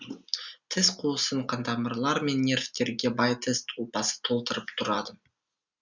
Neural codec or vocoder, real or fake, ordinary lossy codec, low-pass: none; real; Opus, 64 kbps; 7.2 kHz